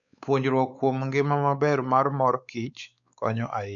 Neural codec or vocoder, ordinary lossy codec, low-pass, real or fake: codec, 16 kHz, 4 kbps, X-Codec, WavLM features, trained on Multilingual LibriSpeech; none; 7.2 kHz; fake